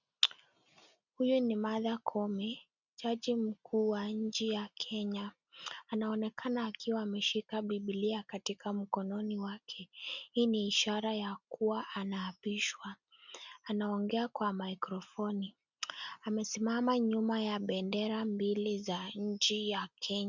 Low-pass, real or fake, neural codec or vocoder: 7.2 kHz; real; none